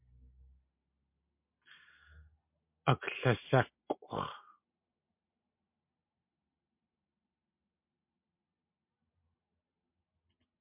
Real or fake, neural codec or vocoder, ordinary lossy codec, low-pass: real; none; MP3, 32 kbps; 3.6 kHz